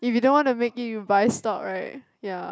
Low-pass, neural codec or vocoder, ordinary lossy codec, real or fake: none; none; none; real